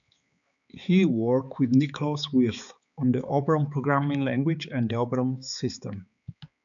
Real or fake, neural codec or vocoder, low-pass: fake; codec, 16 kHz, 4 kbps, X-Codec, HuBERT features, trained on balanced general audio; 7.2 kHz